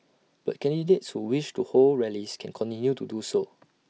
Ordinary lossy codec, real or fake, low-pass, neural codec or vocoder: none; real; none; none